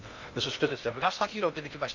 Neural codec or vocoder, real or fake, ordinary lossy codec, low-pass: codec, 16 kHz in and 24 kHz out, 0.6 kbps, FocalCodec, streaming, 2048 codes; fake; MP3, 64 kbps; 7.2 kHz